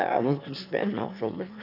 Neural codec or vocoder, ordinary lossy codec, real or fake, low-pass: autoencoder, 22.05 kHz, a latent of 192 numbers a frame, VITS, trained on one speaker; none; fake; 5.4 kHz